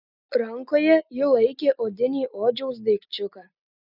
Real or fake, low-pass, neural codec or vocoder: real; 5.4 kHz; none